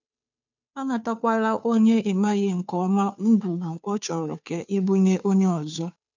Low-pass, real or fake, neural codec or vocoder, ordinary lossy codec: 7.2 kHz; fake; codec, 16 kHz, 2 kbps, FunCodec, trained on Chinese and English, 25 frames a second; none